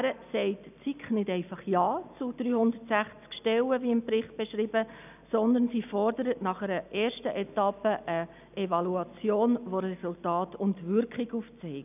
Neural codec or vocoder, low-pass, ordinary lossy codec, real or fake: none; 3.6 kHz; none; real